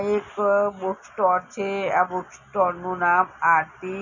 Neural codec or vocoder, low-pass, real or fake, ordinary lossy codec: none; 7.2 kHz; real; none